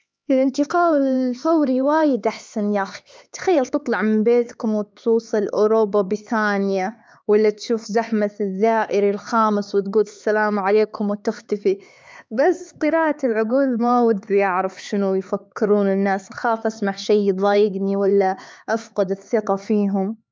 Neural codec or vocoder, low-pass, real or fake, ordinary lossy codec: codec, 16 kHz, 4 kbps, X-Codec, HuBERT features, trained on LibriSpeech; none; fake; none